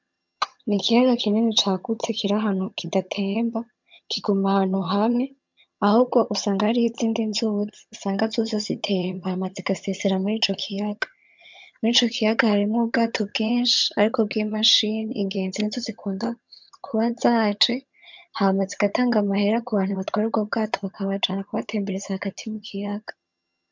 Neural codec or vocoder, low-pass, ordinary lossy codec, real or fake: vocoder, 22.05 kHz, 80 mel bands, HiFi-GAN; 7.2 kHz; MP3, 64 kbps; fake